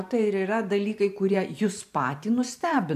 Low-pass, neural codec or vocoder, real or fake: 14.4 kHz; vocoder, 44.1 kHz, 128 mel bands every 512 samples, BigVGAN v2; fake